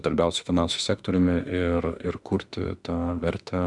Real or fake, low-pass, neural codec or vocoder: fake; 10.8 kHz; autoencoder, 48 kHz, 32 numbers a frame, DAC-VAE, trained on Japanese speech